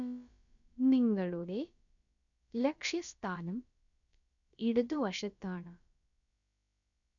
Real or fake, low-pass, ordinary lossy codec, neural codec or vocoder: fake; 7.2 kHz; none; codec, 16 kHz, about 1 kbps, DyCAST, with the encoder's durations